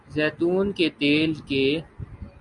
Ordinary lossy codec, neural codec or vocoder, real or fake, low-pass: Opus, 64 kbps; none; real; 10.8 kHz